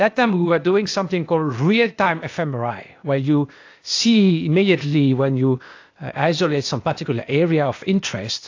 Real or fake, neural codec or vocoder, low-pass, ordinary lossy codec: fake; codec, 16 kHz, 0.8 kbps, ZipCodec; 7.2 kHz; AAC, 48 kbps